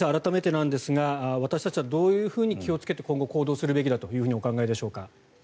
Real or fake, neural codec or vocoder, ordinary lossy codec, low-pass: real; none; none; none